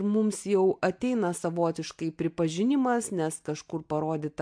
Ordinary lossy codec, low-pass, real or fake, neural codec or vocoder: MP3, 64 kbps; 9.9 kHz; real; none